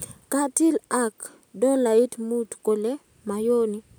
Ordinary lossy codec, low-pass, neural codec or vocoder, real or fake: none; none; none; real